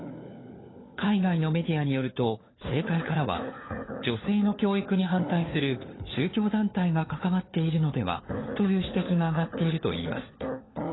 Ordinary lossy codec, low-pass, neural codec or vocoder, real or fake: AAC, 16 kbps; 7.2 kHz; codec, 16 kHz, 4 kbps, FunCodec, trained on LibriTTS, 50 frames a second; fake